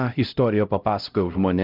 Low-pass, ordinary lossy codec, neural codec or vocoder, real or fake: 5.4 kHz; Opus, 32 kbps; codec, 16 kHz, 0.5 kbps, X-Codec, HuBERT features, trained on LibriSpeech; fake